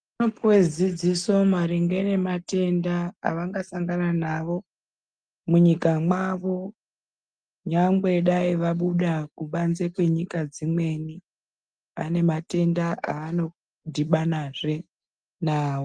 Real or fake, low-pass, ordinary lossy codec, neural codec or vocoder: real; 9.9 kHz; Opus, 32 kbps; none